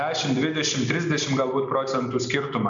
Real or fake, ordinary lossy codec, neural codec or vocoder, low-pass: real; MP3, 64 kbps; none; 7.2 kHz